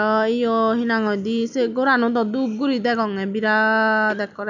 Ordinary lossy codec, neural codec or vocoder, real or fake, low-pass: none; none; real; 7.2 kHz